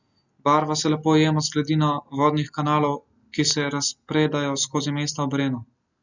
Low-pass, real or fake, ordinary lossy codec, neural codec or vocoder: 7.2 kHz; real; none; none